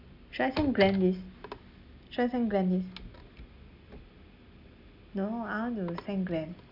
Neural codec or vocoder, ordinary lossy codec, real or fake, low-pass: none; none; real; 5.4 kHz